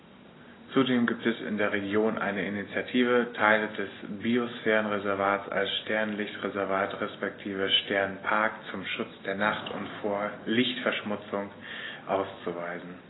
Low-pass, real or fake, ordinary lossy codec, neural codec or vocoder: 7.2 kHz; real; AAC, 16 kbps; none